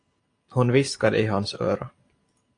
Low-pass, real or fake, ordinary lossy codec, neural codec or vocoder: 9.9 kHz; real; AAC, 48 kbps; none